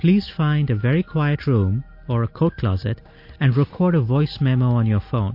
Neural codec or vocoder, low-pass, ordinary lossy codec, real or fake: none; 5.4 kHz; MP3, 32 kbps; real